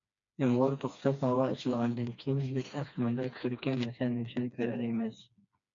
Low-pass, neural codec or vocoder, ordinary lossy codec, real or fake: 7.2 kHz; codec, 16 kHz, 2 kbps, FreqCodec, smaller model; MP3, 64 kbps; fake